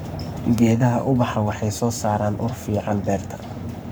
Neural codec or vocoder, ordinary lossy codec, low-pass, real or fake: codec, 44.1 kHz, 7.8 kbps, Pupu-Codec; none; none; fake